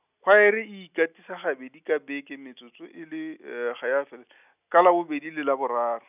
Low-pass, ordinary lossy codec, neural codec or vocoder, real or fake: 3.6 kHz; none; none; real